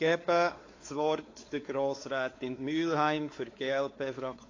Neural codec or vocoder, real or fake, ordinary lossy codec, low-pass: codec, 16 kHz, 4 kbps, X-Codec, WavLM features, trained on Multilingual LibriSpeech; fake; AAC, 32 kbps; 7.2 kHz